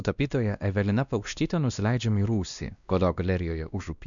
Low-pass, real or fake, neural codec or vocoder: 7.2 kHz; fake; codec, 16 kHz, 1 kbps, X-Codec, WavLM features, trained on Multilingual LibriSpeech